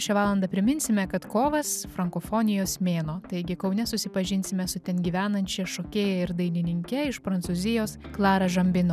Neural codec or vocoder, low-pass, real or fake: none; 14.4 kHz; real